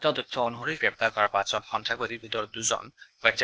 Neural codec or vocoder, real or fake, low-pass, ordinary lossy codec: codec, 16 kHz, 0.8 kbps, ZipCodec; fake; none; none